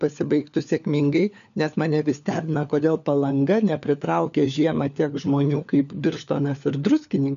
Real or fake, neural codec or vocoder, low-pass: fake; codec, 16 kHz, 4 kbps, FunCodec, trained on LibriTTS, 50 frames a second; 7.2 kHz